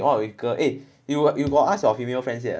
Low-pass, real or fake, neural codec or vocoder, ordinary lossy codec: none; real; none; none